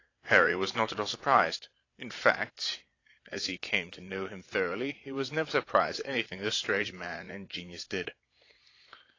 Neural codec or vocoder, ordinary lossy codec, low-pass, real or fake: vocoder, 22.05 kHz, 80 mel bands, Vocos; AAC, 32 kbps; 7.2 kHz; fake